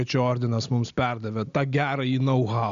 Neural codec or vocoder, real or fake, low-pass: none; real; 7.2 kHz